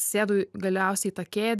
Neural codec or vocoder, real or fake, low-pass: none; real; 14.4 kHz